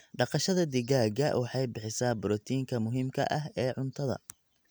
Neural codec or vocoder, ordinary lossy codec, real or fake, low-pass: none; none; real; none